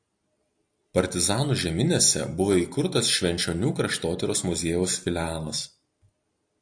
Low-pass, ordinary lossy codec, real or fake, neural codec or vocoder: 9.9 kHz; AAC, 64 kbps; real; none